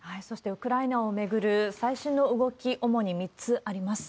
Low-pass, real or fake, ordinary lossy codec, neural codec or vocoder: none; real; none; none